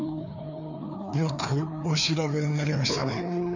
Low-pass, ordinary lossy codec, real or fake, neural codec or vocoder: 7.2 kHz; none; fake; codec, 16 kHz, 2 kbps, FreqCodec, larger model